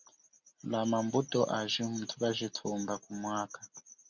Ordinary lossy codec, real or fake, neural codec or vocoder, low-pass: Opus, 64 kbps; real; none; 7.2 kHz